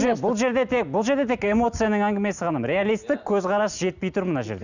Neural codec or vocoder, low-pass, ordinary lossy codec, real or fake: none; 7.2 kHz; none; real